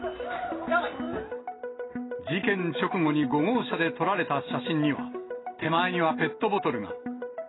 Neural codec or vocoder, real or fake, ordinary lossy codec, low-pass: none; real; AAC, 16 kbps; 7.2 kHz